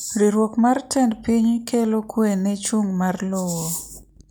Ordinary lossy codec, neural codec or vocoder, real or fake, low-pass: none; none; real; none